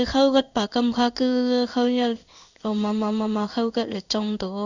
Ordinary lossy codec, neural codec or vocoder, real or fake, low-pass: none; codec, 16 kHz in and 24 kHz out, 1 kbps, XY-Tokenizer; fake; 7.2 kHz